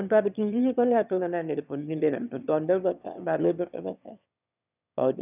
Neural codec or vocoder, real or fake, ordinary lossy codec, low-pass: autoencoder, 22.05 kHz, a latent of 192 numbers a frame, VITS, trained on one speaker; fake; none; 3.6 kHz